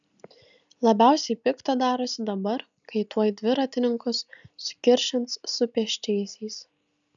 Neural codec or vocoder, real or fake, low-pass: none; real; 7.2 kHz